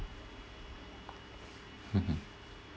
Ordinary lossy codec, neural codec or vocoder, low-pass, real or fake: none; none; none; real